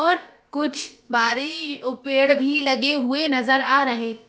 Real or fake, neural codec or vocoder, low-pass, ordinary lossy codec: fake; codec, 16 kHz, about 1 kbps, DyCAST, with the encoder's durations; none; none